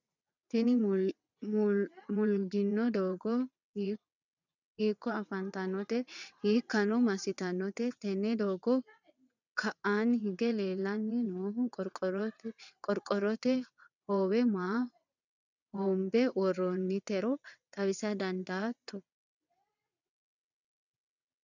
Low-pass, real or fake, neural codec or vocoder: 7.2 kHz; fake; vocoder, 22.05 kHz, 80 mel bands, WaveNeXt